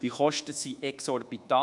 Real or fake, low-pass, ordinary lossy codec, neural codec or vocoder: fake; 10.8 kHz; MP3, 96 kbps; codec, 24 kHz, 1.2 kbps, DualCodec